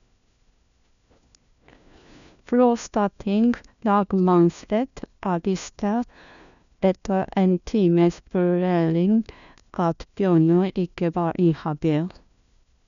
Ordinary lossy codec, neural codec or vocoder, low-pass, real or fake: none; codec, 16 kHz, 1 kbps, FunCodec, trained on LibriTTS, 50 frames a second; 7.2 kHz; fake